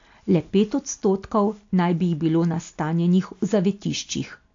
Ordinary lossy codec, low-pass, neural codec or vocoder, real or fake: AAC, 48 kbps; 7.2 kHz; none; real